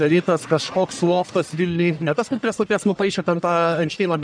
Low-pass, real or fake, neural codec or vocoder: 9.9 kHz; fake; codec, 44.1 kHz, 1.7 kbps, Pupu-Codec